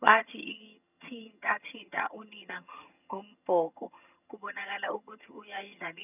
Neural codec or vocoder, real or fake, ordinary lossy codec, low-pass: vocoder, 22.05 kHz, 80 mel bands, HiFi-GAN; fake; none; 3.6 kHz